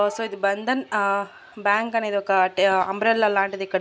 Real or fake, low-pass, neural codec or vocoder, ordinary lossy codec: real; none; none; none